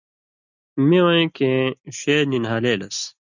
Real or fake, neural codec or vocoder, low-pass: real; none; 7.2 kHz